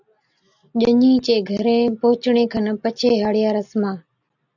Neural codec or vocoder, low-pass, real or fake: none; 7.2 kHz; real